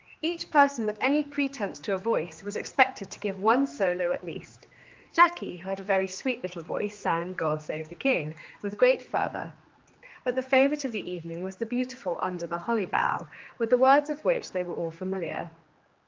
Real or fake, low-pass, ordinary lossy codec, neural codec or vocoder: fake; 7.2 kHz; Opus, 32 kbps; codec, 16 kHz, 2 kbps, X-Codec, HuBERT features, trained on general audio